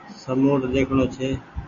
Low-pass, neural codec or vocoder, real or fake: 7.2 kHz; none; real